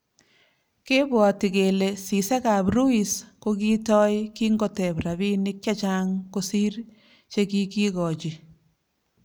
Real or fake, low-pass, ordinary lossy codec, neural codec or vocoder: real; none; none; none